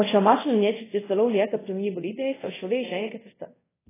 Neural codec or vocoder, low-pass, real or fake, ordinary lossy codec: codec, 24 kHz, 0.5 kbps, DualCodec; 3.6 kHz; fake; AAC, 16 kbps